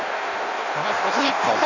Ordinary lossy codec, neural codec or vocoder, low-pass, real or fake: none; codec, 16 kHz in and 24 kHz out, 1.1 kbps, FireRedTTS-2 codec; 7.2 kHz; fake